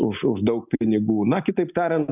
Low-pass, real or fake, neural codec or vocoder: 3.6 kHz; real; none